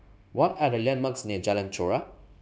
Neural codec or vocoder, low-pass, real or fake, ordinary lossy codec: codec, 16 kHz, 0.9 kbps, LongCat-Audio-Codec; none; fake; none